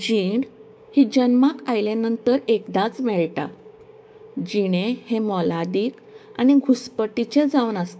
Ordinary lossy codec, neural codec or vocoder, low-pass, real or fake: none; codec, 16 kHz, 6 kbps, DAC; none; fake